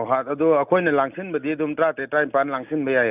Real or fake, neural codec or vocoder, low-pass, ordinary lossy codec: real; none; 3.6 kHz; none